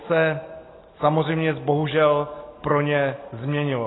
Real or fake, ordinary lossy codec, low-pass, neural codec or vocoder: real; AAC, 16 kbps; 7.2 kHz; none